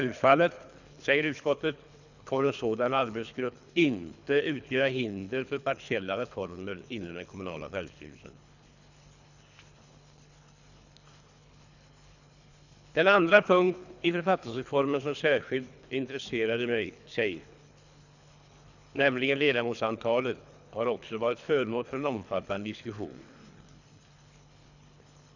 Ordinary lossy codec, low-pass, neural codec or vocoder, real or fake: none; 7.2 kHz; codec, 24 kHz, 3 kbps, HILCodec; fake